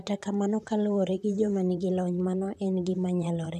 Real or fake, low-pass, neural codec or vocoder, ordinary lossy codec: fake; 14.4 kHz; vocoder, 44.1 kHz, 128 mel bands, Pupu-Vocoder; none